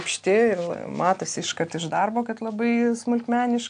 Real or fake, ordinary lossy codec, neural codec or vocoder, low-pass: real; AAC, 64 kbps; none; 9.9 kHz